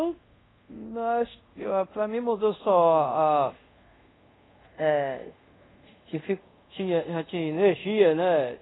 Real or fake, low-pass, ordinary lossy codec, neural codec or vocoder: fake; 7.2 kHz; AAC, 16 kbps; codec, 24 kHz, 0.5 kbps, DualCodec